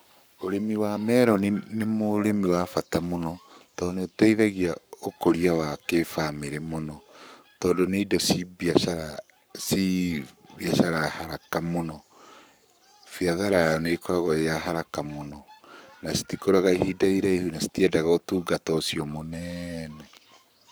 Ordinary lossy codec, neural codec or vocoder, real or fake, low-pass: none; codec, 44.1 kHz, 7.8 kbps, Pupu-Codec; fake; none